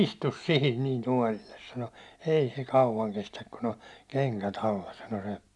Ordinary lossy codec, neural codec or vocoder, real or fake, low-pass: none; none; real; none